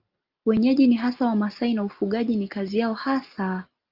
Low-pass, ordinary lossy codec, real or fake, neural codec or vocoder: 5.4 kHz; Opus, 24 kbps; real; none